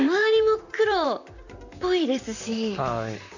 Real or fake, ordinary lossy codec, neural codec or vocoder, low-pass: fake; none; vocoder, 44.1 kHz, 128 mel bands, Pupu-Vocoder; 7.2 kHz